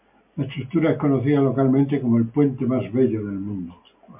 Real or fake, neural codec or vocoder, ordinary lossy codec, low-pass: real; none; MP3, 32 kbps; 3.6 kHz